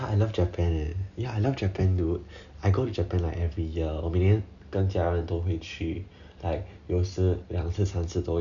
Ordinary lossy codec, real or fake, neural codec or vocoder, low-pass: none; real; none; 7.2 kHz